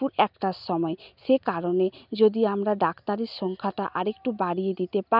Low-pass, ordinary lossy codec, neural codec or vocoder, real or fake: 5.4 kHz; none; none; real